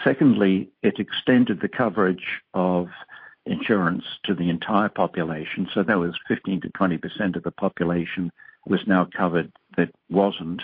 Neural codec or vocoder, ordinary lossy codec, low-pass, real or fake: none; MP3, 32 kbps; 5.4 kHz; real